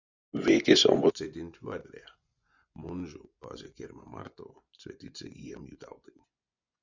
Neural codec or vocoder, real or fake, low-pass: vocoder, 24 kHz, 100 mel bands, Vocos; fake; 7.2 kHz